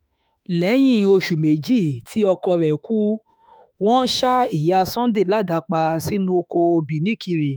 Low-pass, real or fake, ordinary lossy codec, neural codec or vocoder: none; fake; none; autoencoder, 48 kHz, 32 numbers a frame, DAC-VAE, trained on Japanese speech